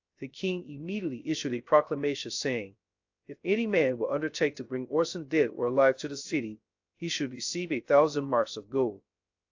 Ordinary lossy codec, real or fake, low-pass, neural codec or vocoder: AAC, 48 kbps; fake; 7.2 kHz; codec, 16 kHz, 0.3 kbps, FocalCodec